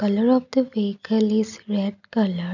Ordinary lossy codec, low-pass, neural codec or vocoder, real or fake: none; 7.2 kHz; none; real